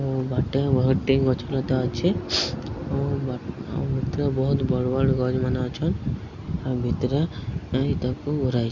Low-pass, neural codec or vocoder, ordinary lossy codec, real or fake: 7.2 kHz; none; Opus, 64 kbps; real